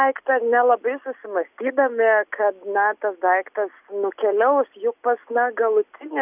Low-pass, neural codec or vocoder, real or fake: 3.6 kHz; none; real